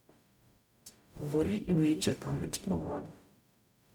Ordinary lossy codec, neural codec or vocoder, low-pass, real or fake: none; codec, 44.1 kHz, 0.9 kbps, DAC; 19.8 kHz; fake